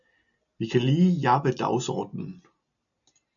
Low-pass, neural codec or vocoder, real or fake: 7.2 kHz; none; real